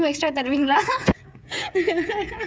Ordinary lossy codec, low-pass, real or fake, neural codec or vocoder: none; none; fake; codec, 16 kHz, 8 kbps, FreqCodec, smaller model